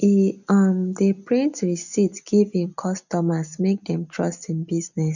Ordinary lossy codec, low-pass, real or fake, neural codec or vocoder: none; 7.2 kHz; real; none